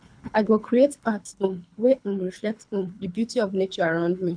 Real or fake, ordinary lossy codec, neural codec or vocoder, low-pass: fake; none; codec, 24 kHz, 3 kbps, HILCodec; 9.9 kHz